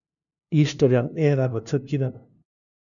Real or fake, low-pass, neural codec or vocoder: fake; 7.2 kHz; codec, 16 kHz, 0.5 kbps, FunCodec, trained on LibriTTS, 25 frames a second